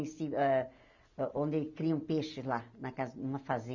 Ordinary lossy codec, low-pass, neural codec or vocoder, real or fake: none; 7.2 kHz; none; real